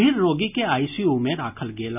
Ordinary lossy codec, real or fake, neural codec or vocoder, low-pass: none; real; none; 3.6 kHz